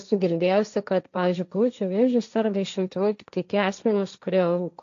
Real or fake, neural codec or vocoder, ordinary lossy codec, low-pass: fake; codec, 16 kHz, 1.1 kbps, Voila-Tokenizer; AAC, 64 kbps; 7.2 kHz